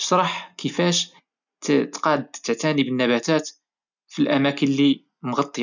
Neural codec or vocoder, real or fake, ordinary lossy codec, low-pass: none; real; none; 7.2 kHz